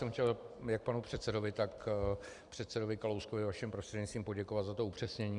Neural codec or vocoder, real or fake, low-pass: vocoder, 44.1 kHz, 128 mel bands every 256 samples, BigVGAN v2; fake; 10.8 kHz